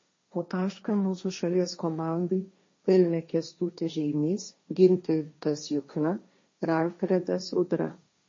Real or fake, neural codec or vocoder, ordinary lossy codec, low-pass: fake; codec, 16 kHz, 1.1 kbps, Voila-Tokenizer; MP3, 32 kbps; 7.2 kHz